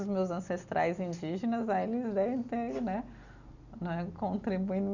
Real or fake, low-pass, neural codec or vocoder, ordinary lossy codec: real; 7.2 kHz; none; none